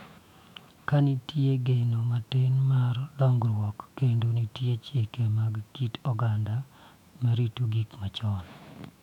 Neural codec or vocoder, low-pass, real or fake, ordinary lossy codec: autoencoder, 48 kHz, 128 numbers a frame, DAC-VAE, trained on Japanese speech; 19.8 kHz; fake; none